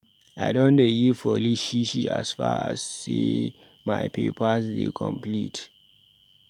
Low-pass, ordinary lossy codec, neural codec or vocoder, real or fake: 19.8 kHz; none; codec, 44.1 kHz, 7.8 kbps, DAC; fake